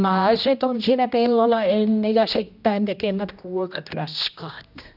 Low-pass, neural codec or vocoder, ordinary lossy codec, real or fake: 5.4 kHz; codec, 16 kHz, 1 kbps, X-Codec, HuBERT features, trained on general audio; none; fake